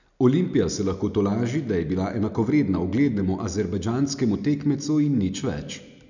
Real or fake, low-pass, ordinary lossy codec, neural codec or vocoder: real; 7.2 kHz; none; none